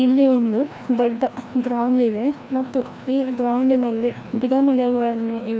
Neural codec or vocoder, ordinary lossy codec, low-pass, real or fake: codec, 16 kHz, 1 kbps, FreqCodec, larger model; none; none; fake